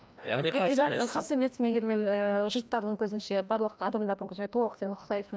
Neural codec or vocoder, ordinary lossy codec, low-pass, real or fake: codec, 16 kHz, 1 kbps, FreqCodec, larger model; none; none; fake